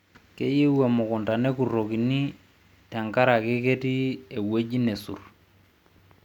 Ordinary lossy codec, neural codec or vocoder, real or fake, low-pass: none; none; real; 19.8 kHz